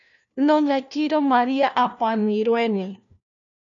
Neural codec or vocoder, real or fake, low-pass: codec, 16 kHz, 1 kbps, FunCodec, trained on LibriTTS, 50 frames a second; fake; 7.2 kHz